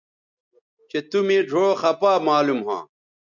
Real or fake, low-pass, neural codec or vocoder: real; 7.2 kHz; none